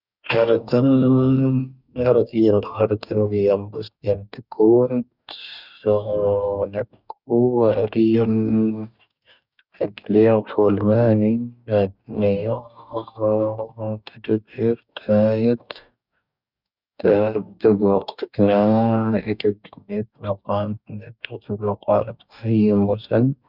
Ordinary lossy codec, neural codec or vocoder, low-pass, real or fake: none; codec, 44.1 kHz, 2.6 kbps, DAC; 5.4 kHz; fake